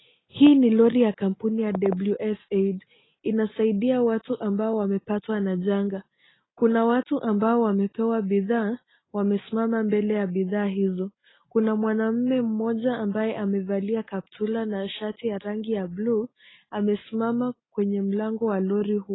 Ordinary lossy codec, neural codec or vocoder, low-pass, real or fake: AAC, 16 kbps; none; 7.2 kHz; real